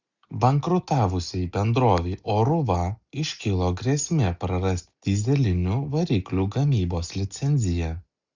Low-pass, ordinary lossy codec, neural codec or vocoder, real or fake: 7.2 kHz; Opus, 64 kbps; none; real